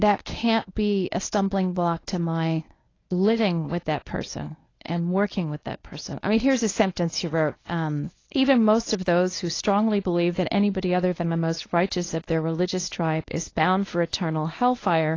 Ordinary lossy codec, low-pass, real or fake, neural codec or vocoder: AAC, 32 kbps; 7.2 kHz; fake; codec, 24 kHz, 0.9 kbps, WavTokenizer, medium speech release version 1